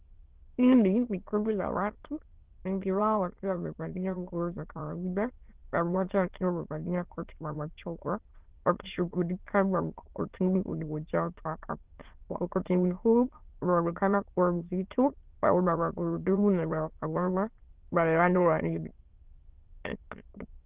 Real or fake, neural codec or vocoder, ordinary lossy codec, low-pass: fake; autoencoder, 22.05 kHz, a latent of 192 numbers a frame, VITS, trained on many speakers; Opus, 16 kbps; 3.6 kHz